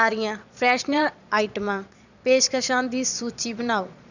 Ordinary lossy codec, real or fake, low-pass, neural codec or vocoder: none; fake; 7.2 kHz; vocoder, 22.05 kHz, 80 mel bands, Vocos